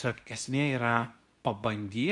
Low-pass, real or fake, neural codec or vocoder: 10.8 kHz; fake; codec, 24 kHz, 0.9 kbps, WavTokenizer, medium speech release version 2